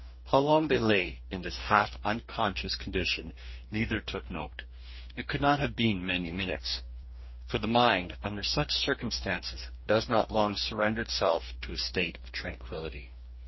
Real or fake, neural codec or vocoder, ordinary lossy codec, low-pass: fake; codec, 44.1 kHz, 2.6 kbps, DAC; MP3, 24 kbps; 7.2 kHz